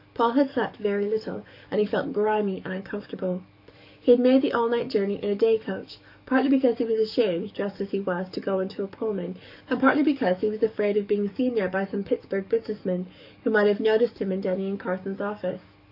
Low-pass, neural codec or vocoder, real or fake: 5.4 kHz; codec, 44.1 kHz, 7.8 kbps, DAC; fake